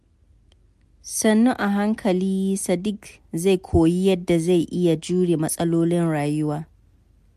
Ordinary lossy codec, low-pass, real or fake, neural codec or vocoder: MP3, 64 kbps; 14.4 kHz; real; none